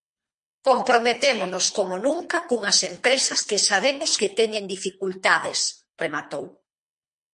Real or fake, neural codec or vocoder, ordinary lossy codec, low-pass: fake; codec, 24 kHz, 3 kbps, HILCodec; MP3, 48 kbps; 10.8 kHz